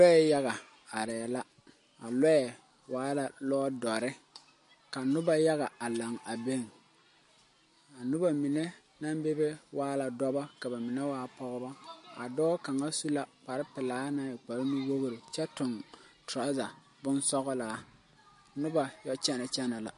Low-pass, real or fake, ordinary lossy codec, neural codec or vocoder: 14.4 kHz; real; MP3, 48 kbps; none